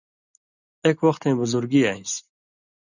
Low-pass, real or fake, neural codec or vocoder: 7.2 kHz; real; none